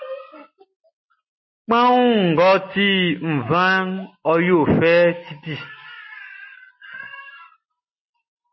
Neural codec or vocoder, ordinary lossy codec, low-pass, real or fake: none; MP3, 24 kbps; 7.2 kHz; real